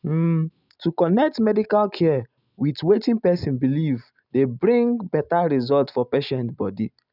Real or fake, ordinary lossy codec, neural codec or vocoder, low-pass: real; none; none; 5.4 kHz